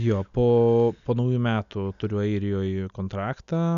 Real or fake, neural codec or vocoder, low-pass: real; none; 7.2 kHz